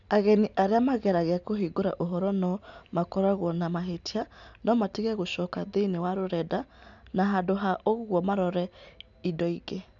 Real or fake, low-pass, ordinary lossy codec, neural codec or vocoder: real; 7.2 kHz; none; none